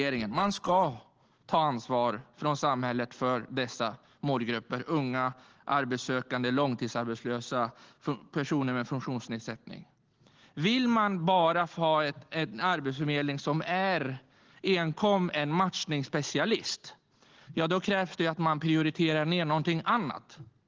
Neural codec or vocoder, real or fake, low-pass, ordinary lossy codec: none; real; 7.2 kHz; Opus, 16 kbps